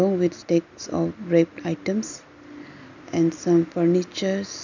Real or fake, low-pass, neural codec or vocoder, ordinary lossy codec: real; 7.2 kHz; none; none